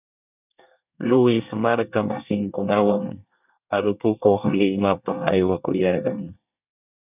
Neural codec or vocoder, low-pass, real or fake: codec, 24 kHz, 1 kbps, SNAC; 3.6 kHz; fake